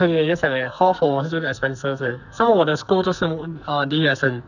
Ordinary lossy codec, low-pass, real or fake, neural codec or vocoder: none; 7.2 kHz; fake; codec, 44.1 kHz, 2.6 kbps, SNAC